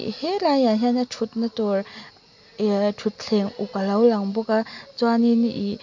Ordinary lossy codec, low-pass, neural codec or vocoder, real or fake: MP3, 64 kbps; 7.2 kHz; none; real